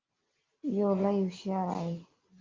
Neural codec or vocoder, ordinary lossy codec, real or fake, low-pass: none; Opus, 32 kbps; real; 7.2 kHz